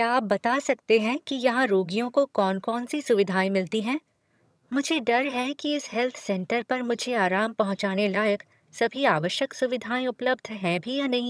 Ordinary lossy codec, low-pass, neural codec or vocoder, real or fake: none; none; vocoder, 22.05 kHz, 80 mel bands, HiFi-GAN; fake